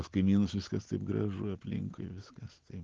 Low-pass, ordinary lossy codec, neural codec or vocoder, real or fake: 7.2 kHz; Opus, 16 kbps; none; real